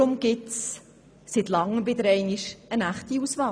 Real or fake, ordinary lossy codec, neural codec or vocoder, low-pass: real; none; none; none